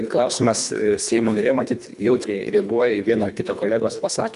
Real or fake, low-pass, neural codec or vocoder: fake; 10.8 kHz; codec, 24 kHz, 1.5 kbps, HILCodec